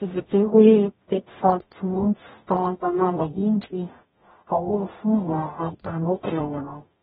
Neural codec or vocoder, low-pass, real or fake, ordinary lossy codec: codec, 44.1 kHz, 0.9 kbps, DAC; 19.8 kHz; fake; AAC, 16 kbps